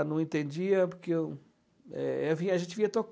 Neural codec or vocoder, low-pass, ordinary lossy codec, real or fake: none; none; none; real